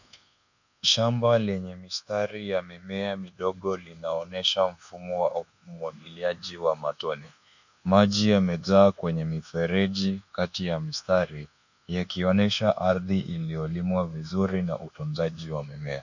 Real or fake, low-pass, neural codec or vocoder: fake; 7.2 kHz; codec, 24 kHz, 1.2 kbps, DualCodec